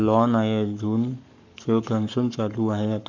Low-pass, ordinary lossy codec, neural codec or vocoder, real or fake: 7.2 kHz; none; codec, 44.1 kHz, 7.8 kbps, Pupu-Codec; fake